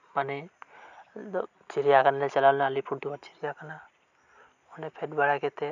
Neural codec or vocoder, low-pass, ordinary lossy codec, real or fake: none; 7.2 kHz; none; real